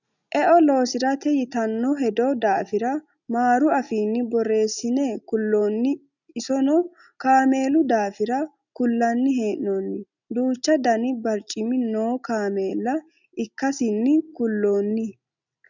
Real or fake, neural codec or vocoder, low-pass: real; none; 7.2 kHz